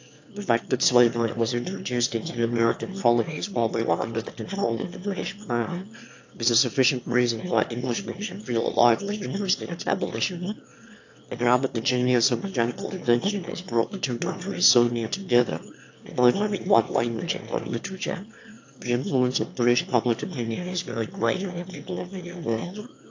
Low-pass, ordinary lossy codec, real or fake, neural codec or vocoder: 7.2 kHz; AAC, 48 kbps; fake; autoencoder, 22.05 kHz, a latent of 192 numbers a frame, VITS, trained on one speaker